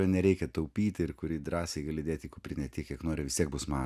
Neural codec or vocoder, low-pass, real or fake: none; 14.4 kHz; real